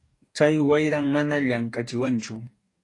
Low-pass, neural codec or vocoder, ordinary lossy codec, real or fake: 10.8 kHz; codec, 44.1 kHz, 2.6 kbps, DAC; AAC, 48 kbps; fake